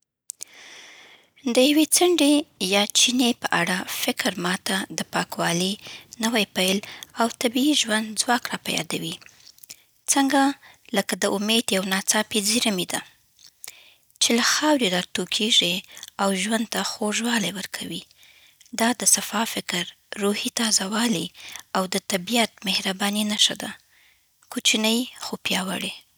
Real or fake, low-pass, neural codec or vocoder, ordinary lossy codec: real; none; none; none